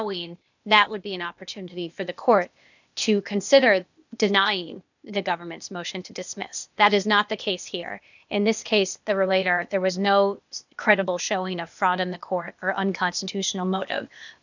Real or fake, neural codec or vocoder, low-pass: fake; codec, 16 kHz, 0.8 kbps, ZipCodec; 7.2 kHz